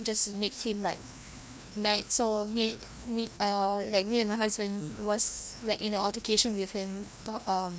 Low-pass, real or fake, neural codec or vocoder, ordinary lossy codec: none; fake; codec, 16 kHz, 1 kbps, FreqCodec, larger model; none